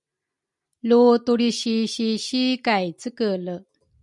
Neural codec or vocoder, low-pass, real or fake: none; 10.8 kHz; real